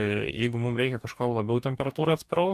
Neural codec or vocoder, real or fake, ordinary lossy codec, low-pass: codec, 44.1 kHz, 2.6 kbps, DAC; fake; MP3, 96 kbps; 14.4 kHz